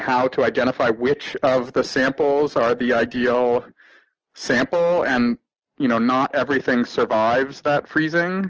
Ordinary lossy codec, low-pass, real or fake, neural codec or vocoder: Opus, 16 kbps; 7.2 kHz; real; none